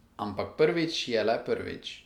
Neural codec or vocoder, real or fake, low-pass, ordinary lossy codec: none; real; 19.8 kHz; none